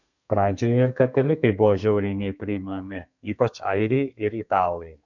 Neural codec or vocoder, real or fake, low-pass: codec, 32 kHz, 1.9 kbps, SNAC; fake; 7.2 kHz